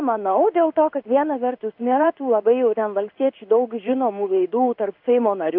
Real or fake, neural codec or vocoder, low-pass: fake; codec, 16 kHz in and 24 kHz out, 1 kbps, XY-Tokenizer; 5.4 kHz